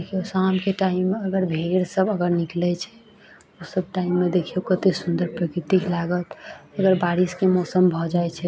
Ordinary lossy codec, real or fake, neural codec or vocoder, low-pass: none; real; none; none